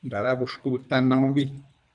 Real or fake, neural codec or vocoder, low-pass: fake; codec, 24 kHz, 3 kbps, HILCodec; 10.8 kHz